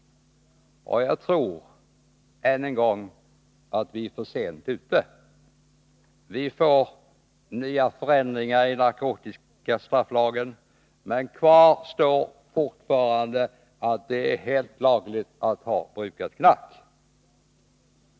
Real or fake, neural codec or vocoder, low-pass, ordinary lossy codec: real; none; none; none